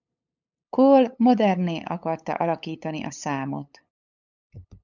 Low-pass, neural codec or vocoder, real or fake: 7.2 kHz; codec, 16 kHz, 8 kbps, FunCodec, trained on LibriTTS, 25 frames a second; fake